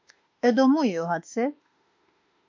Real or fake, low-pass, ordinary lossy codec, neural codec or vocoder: fake; 7.2 kHz; MP3, 48 kbps; autoencoder, 48 kHz, 32 numbers a frame, DAC-VAE, trained on Japanese speech